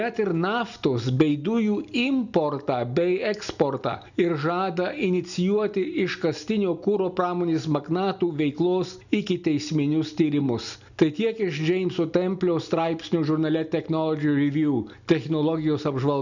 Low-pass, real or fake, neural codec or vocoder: 7.2 kHz; real; none